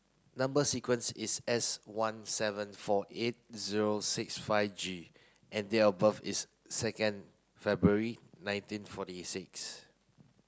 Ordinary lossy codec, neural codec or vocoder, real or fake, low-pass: none; none; real; none